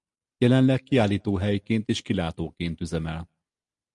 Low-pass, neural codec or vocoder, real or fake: 10.8 kHz; none; real